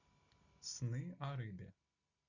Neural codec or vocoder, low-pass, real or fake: none; 7.2 kHz; real